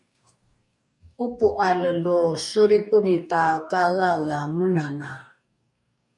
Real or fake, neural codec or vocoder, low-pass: fake; codec, 44.1 kHz, 2.6 kbps, DAC; 10.8 kHz